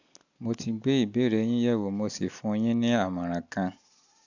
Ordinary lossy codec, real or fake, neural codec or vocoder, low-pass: none; real; none; 7.2 kHz